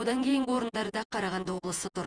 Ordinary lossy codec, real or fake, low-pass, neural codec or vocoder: Opus, 32 kbps; fake; 9.9 kHz; vocoder, 48 kHz, 128 mel bands, Vocos